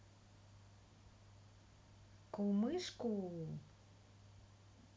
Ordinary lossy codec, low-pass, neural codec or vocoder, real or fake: none; none; none; real